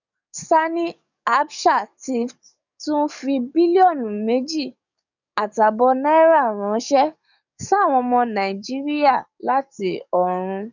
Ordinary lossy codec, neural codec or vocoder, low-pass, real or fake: none; codec, 44.1 kHz, 7.8 kbps, DAC; 7.2 kHz; fake